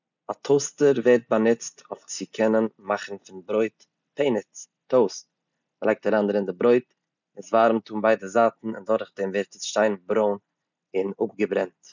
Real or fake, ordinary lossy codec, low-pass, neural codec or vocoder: real; none; 7.2 kHz; none